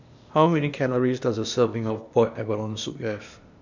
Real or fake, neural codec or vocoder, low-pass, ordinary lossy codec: fake; codec, 16 kHz, 0.8 kbps, ZipCodec; 7.2 kHz; none